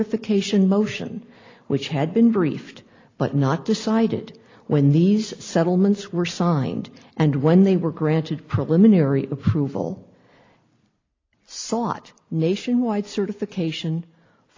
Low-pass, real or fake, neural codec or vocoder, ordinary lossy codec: 7.2 kHz; real; none; AAC, 32 kbps